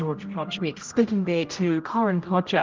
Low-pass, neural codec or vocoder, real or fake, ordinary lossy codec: 7.2 kHz; codec, 16 kHz, 0.5 kbps, X-Codec, HuBERT features, trained on general audio; fake; Opus, 24 kbps